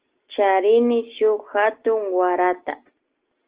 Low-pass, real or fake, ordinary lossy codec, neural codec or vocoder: 3.6 kHz; real; Opus, 16 kbps; none